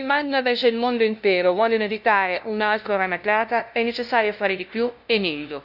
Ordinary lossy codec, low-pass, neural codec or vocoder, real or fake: none; 5.4 kHz; codec, 16 kHz, 0.5 kbps, FunCodec, trained on LibriTTS, 25 frames a second; fake